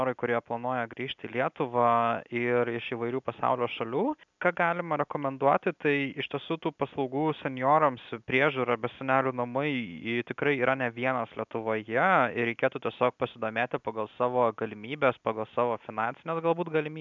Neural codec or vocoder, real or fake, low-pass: none; real; 7.2 kHz